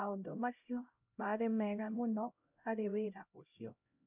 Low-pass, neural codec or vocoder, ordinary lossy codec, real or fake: 3.6 kHz; codec, 16 kHz, 0.5 kbps, X-Codec, HuBERT features, trained on LibriSpeech; none; fake